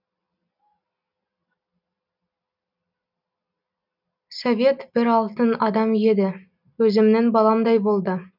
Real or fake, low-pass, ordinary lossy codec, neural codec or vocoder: real; 5.4 kHz; none; none